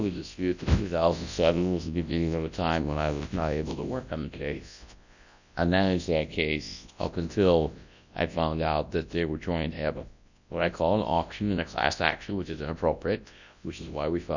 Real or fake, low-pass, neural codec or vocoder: fake; 7.2 kHz; codec, 24 kHz, 0.9 kbps, WavTokenizer, large speech release